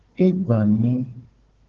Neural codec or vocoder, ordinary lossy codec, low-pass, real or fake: codec, 16 kHz, 4 kbps, FunCodec, trained on Chinese and English, 50 frames a second; Opus, 16 kbps; 7.2 kHz; fake